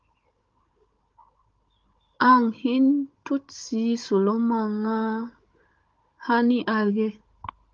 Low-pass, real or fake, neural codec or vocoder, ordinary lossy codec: 7.2 kHz; fake; codec, 16 kHz, 16 kbps, FunCodec, trained on Chinese and English, 50 frames a second; Opus, 32 kbps